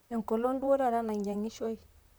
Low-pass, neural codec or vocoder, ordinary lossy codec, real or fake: none; vocoder, 44.1 kHz, 128 mel bands, Pupu-Vocoder; none; fake